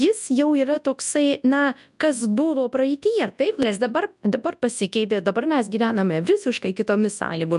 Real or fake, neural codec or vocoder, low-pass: fake; codec, 24 kHz, 0.9 kbps, WavTokenizer, large speech release; 10.8 kHz